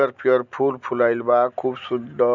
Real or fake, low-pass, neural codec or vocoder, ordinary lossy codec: real; 7.2 kHz; none; none